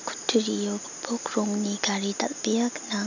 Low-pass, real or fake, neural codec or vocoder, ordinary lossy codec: 7.2 kHz; real; none; none